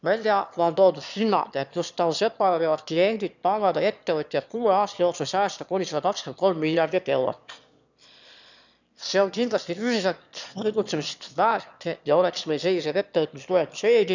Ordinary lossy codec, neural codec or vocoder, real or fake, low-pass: none; autoencoder, 22.05 kHz, a latent of 192 numbers a frame, VITS, trained on one speaker; fake; 7.2 kHz